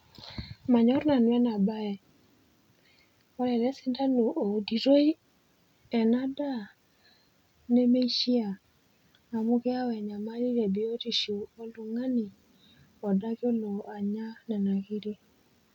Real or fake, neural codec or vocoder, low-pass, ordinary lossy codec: real; none; 19.8 kHz; none